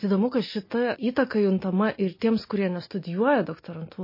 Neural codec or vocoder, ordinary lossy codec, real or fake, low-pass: none; MP3, 24 kbps; real; 5.4 kHz